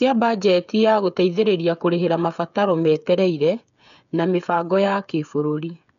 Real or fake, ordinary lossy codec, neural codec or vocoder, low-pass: fake; none; codec, 16 kHz, 8 kbps, FreqCodec, smaller model; 7.2 kHz